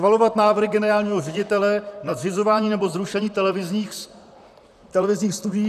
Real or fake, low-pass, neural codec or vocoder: fake; 14.4 kHz; vocoder, 44.1 kHz, 128 mel bands, Pupu-Vocoder